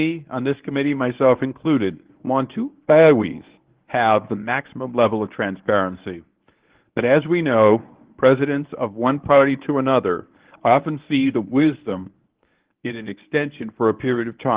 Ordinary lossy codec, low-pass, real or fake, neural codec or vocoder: Opus, 16 kbps; 3.6 kHz; fake; codec, 24 kHz, 0.9 kbps, WavTokenizer, medium speech release version 1